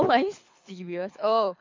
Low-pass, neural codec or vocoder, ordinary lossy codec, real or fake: 7.2 kHz; codec, 24 kHz, 6 kbps, HILCodec; MP3, 48 kbps; fake